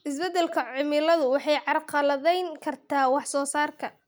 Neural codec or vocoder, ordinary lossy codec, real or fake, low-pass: none; none; real; none